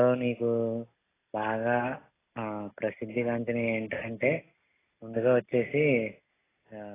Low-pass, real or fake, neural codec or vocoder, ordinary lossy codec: 3.6 kHz; real; none; AAC, 16 kbps